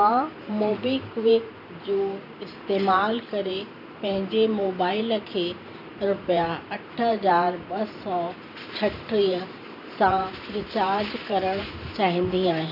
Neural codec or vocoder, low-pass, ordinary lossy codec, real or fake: vocoder, 44.1 kHz, 128 mel bands every 512 samples, BigVGAN v2; 5.4 kHz; none; fake